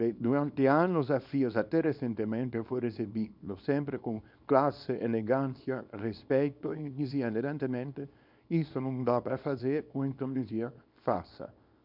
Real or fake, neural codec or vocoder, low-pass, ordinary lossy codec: fake; codec, 24 kHz, 0.9 kbps, WavTokenizer, small release; 5.4 kHz; none